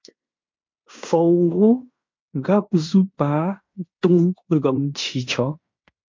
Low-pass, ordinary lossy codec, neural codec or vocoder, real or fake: 7.2 kHz; MP3, 48 kbps; codec, 16 kHz in and 24 kHz out, 0.9 kbps, LongCat-Audio-Codec, fine tuned four codebook decoder; fake